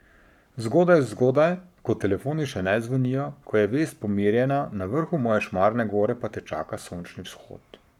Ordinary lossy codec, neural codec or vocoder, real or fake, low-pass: none; codec, 44.1 kHz, 7.8 kbps, Pupu-Codec; fake; 19.8 kHz